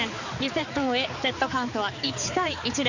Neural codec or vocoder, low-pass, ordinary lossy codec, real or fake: codec, 16 kHz, 4 kbps, X-Codec, HuBERT features, trained on balanced general audio; 7.2 kHz; none; fake